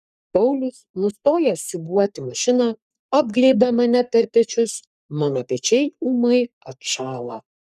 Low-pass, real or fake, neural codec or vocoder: 14.4 kHz; fake; codec, 44.1 kHz, 3.4 kbps, Pupu-Codec